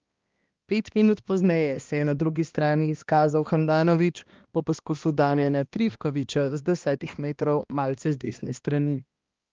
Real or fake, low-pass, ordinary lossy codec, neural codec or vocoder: fake; 7.2 kHz; Opus, 24 kbps; codec, 16 kHz, 1 kbps, X-Codec, HuBERT features, trained on balanced general audio